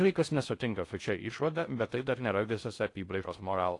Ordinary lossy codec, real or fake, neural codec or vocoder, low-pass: AAC, 48 kbps; fake; codec, 16 kHz in and 24 kHz out, 0.6 kbps, FocalCodec, streaming, 4096 codes; 10.8 kHz